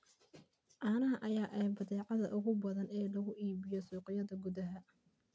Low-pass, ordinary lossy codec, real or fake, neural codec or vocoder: none; none; real; none